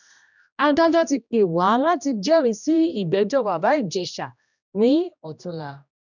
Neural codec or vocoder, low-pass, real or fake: codec, 16 kHz, 1 kbps, X-Codec, HuBERT features, trained on general audio; 7.2 kHz; fake